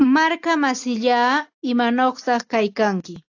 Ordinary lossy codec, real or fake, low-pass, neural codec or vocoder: AAC, 48 kbps; real; 7.2 kHz; none